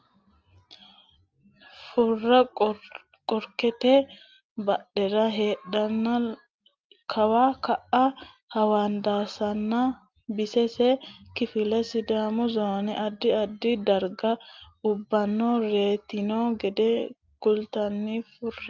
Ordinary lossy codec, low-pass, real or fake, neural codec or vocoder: Opus, 24 kbps; 7.2 kHz; real; none